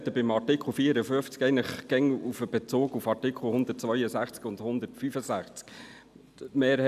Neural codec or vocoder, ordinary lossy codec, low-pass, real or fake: none; none; 14.4 kHz; real